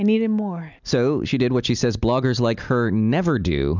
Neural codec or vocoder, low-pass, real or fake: none; 7.2 kHz; real